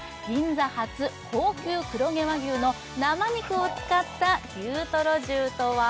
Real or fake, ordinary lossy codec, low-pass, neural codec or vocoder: real; none; none; none